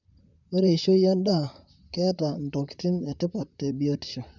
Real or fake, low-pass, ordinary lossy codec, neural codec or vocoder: fake; 7.2 kHz; none; vocoder, 44.1 kHz, 128 mel bands, Pupu-Vocoder